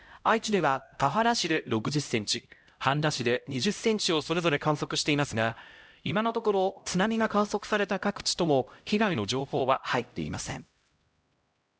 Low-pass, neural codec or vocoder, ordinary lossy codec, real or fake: none; codec, 16 kHz, 0.5 kbps, X-Codec, HuBERT features, trained on LibriSpeech; none; fake